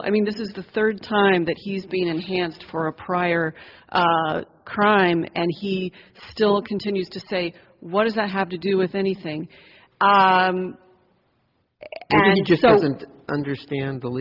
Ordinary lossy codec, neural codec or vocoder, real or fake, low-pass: Opus, 32 kbps; none; real; 5.4 kHz